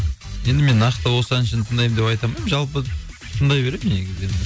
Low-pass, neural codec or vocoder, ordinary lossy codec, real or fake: none; none; none; real